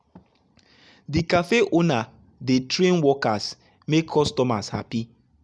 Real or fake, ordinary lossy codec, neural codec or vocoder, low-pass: real; none; none; none